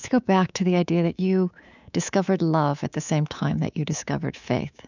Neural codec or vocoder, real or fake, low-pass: codec, 24 kHz, 3.1 kbps, DualCodec; fake; 7.2 kHz